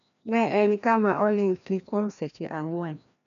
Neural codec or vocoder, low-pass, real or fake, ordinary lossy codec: codec, 16 kHz, 1 kbps, FreqCodec, larger model; 7.2 kHz; fake; none